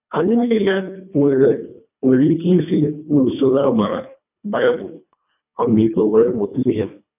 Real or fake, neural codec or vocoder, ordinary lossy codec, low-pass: fake; codec, 24 kHz, 1.5 kbps, HILCodec; none; 3.6 kHz